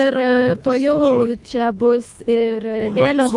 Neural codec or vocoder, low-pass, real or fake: codec, 24 kHz, 1.5 kbps, HILCodec; 10.8 kHz; fake